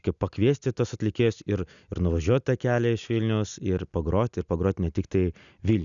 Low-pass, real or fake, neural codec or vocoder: 7.2 kHz; real; none